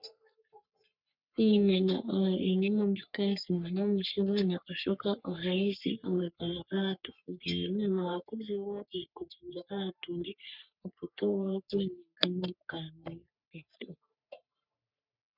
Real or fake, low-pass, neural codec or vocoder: fake; 5.4 kHz; codec, 44.1 kHz, 3.4 kbps, Pupu-Codec